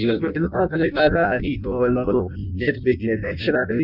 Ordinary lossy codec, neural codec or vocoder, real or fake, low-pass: none; codec, 16 kHz in and 24 kHz out, 0.6 kbps, FireRedTTS-2 codec; fake; 5.4 kHz